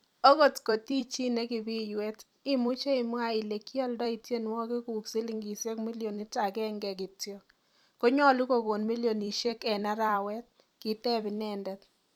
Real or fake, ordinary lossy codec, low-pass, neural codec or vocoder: fake; none; 19.8 kHz; vocoder, 44.1 kHz, 128 mel bands every 256 samples, BigVGAN v2